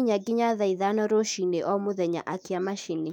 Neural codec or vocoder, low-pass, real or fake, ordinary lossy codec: autoencoder, 48 kHz, 128 numbers a frame, DAC-VAE, trained on Japanese speech; 19.8 kHz; fake; none